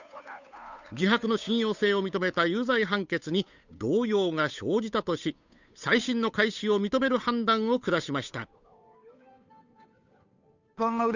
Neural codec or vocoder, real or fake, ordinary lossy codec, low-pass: codec, 16 kHz, 8 kbps, FunCodec, trained on Chinese and English, 25 frames a second; fake; none; 7.2 kHz